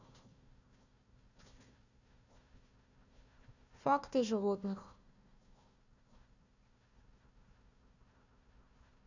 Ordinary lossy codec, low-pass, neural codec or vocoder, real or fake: none; 7.2 kHz; codec, 16 kHz, 1 kbps, FunCodec, trained on Chinese and English, 50 frames a second; fake